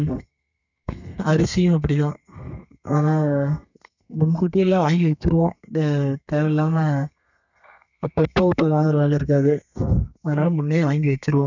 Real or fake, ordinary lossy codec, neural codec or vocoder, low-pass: fake; none; codec, 32 kHz, 1.9 kbps, SNAC; 7.2 kHz